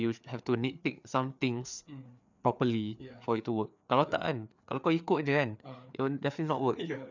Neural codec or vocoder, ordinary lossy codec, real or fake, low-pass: codec, 16 kHz, 4 kbps, FreqCodec, larger model; none; fake; 7.2 kHz